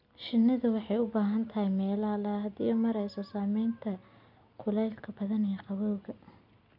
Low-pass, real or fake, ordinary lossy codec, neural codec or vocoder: 5.4 kHz; real; none; none